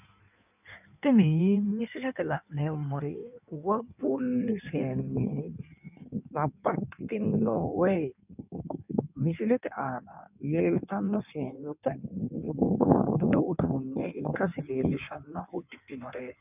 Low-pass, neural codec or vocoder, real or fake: 3.6 kHz; codec, 16 kHz in and 24 kHz out, 1.1 kbps, FireRedTTS-2 codec; fake